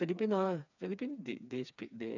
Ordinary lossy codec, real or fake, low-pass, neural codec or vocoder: none; fake; 7.2 kHz; codec, 16 kHz, 4 kbps, FreqCodec, smaller model